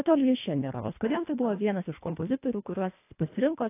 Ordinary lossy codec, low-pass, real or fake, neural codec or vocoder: AAC, 24 kbps; 3.6 kHz; fake; codec, 24 kHz, 1.5 kbps, HILCodec